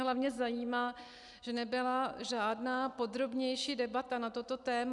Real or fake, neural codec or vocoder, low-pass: real; none; 10.8 kHz